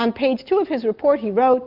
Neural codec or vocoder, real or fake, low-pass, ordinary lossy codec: none; real; 5.4 kHz; Opus, 32 kbps